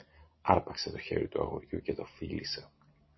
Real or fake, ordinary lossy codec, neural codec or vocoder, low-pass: real; MP3, 24 kbps; none; 7.2 kHz